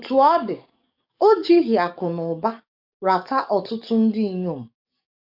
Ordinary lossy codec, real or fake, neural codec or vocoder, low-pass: none; fake; codec, 44.1 kHz, 7.8 kbps, DAC; 5.4 kHz